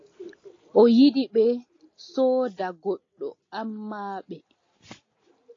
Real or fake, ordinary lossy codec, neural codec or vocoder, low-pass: real; AAC, 32 kbps; none; 7.2 kHz